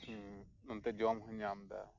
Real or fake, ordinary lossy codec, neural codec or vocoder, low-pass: real; none; none; 7.2 kHz